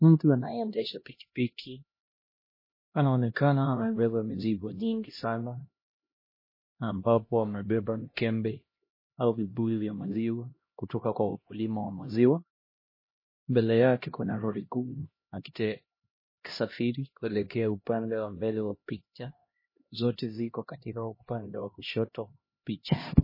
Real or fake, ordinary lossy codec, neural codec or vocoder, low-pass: fake; MP3, 24 kbps; codec, 16 kHz, 1 kbps, X-Codec, HuBERT features, trained on LibriSpeech; 5.4 kHz